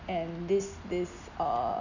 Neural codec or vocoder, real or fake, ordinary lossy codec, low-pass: none; real; none; 7.2 kHz